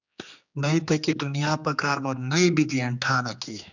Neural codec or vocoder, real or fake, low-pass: codec, 16 kHz, 2 kbps, X-Codec, HuBERT features, trained on general audio; fake; 7.2 kHz